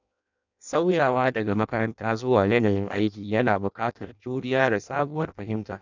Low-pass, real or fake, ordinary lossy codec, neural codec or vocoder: 7.2 kHz; fake; none; codec, 16 kHz in and 24 kHz out, 0.6 kbps, FireRedTTS-2 codec